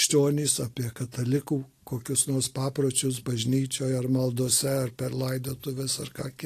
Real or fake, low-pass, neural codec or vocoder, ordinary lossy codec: real; 14.4 kHz; none; AAC, 48 kbps